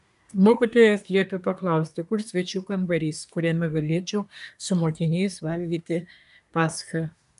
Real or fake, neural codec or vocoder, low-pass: fake; codec, 24 kHz, 1 kbps, SNAC; 10.8 kHz